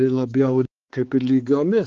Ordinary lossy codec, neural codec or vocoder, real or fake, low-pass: Opus, 16 kbps; codec, 16 kHz, 4 kbps, X-Codec, HuBERT features, trained on balanced general audio; fake; 7.2 kHz